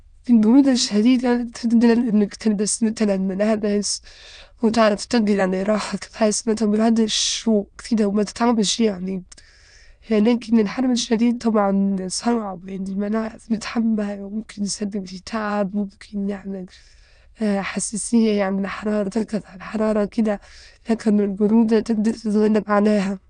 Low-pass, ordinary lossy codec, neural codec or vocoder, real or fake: 9.9 kHz; none; autoencoder, 22.05 kHz, a latent of 192 numbers a frame, VITS, trained on many speakers; fake